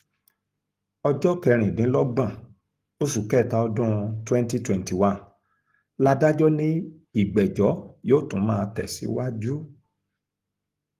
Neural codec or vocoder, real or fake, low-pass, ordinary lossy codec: codec, 44.1 kHz, 7.8 kbps, Pupu-Codec; fake; 14.4 kHz; Opus, 24 kbps